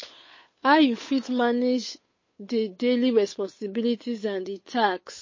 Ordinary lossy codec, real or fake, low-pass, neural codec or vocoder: MP3, 32 kbps; fake; 7.2 kHz; codec, 16 kHz, 8 kbps, FunCodec, trained on Chinese and English, 25 frames a second